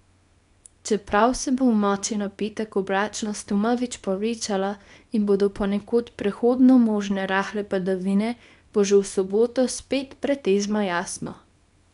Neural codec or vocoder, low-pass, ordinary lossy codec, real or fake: codec, 24 kHz, 0.9 kbps, WavTokenizer, small release; 10.8 kHz; none; fake